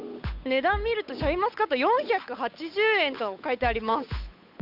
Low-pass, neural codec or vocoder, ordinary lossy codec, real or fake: 5.4 kHz; codec, 16 kHz, 8 kbps, FunCodec, trained on Chinese and English, 25 frames a second; none; fake